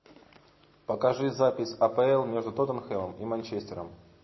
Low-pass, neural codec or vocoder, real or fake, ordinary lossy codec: 7.2 kHz; none; real; MP3, 24 kbps